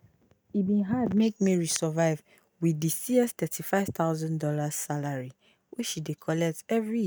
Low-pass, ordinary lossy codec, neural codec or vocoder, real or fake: none; none; none; real